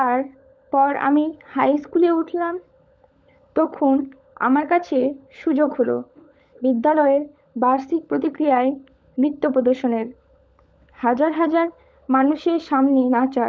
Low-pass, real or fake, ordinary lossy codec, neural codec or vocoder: none; fake; none; codec, 16 kHz, 16 kbps, FunCodec, trained on LibriTTS, 50 frames a second